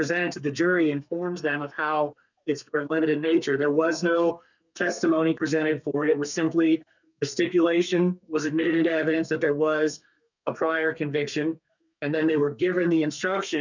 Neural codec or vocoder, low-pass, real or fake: codec, 32 kHz, 1.9 kbps, SNAC; 7.2 kHz; fake